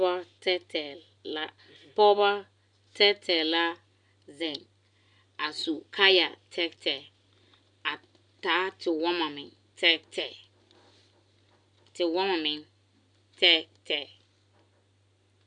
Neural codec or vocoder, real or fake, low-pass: none; real; 9.9 kHz